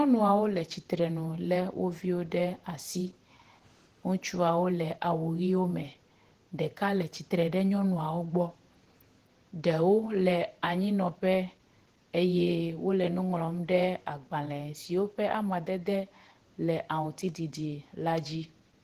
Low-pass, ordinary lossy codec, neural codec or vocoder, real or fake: 14.4 kHz; Opus, 16 kbps; vocoder, 48 kHz, 128 mel bands, Vocos; fake